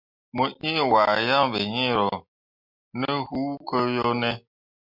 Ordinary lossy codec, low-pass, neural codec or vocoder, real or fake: MP3, 48 kbps; 5.4 kHz; none; real